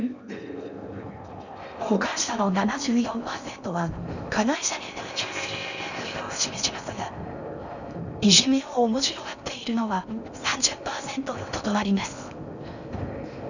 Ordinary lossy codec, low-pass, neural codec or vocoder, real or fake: none; 7.2 kHz; codec, 16 kHz in and 24 kHz out, 0.6 kbps, FocalCodec, streaming, 4096 codes; fake